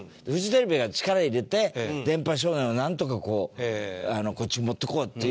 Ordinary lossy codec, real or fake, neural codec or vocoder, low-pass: none; real; none; none